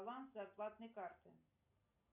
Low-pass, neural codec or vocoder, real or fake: 3.6 kHz; none; real